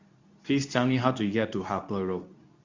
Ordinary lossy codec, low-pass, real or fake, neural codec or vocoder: Opus, 64 kbps; 7.2 kHz; fake; codec, 24 kHz, 0.9 kbps, WavTokenizer, medium speech release version 2